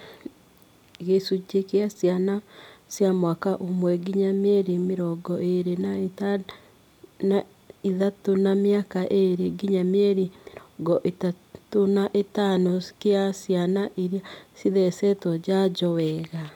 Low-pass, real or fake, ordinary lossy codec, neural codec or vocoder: 19.8 kHz; real; none; none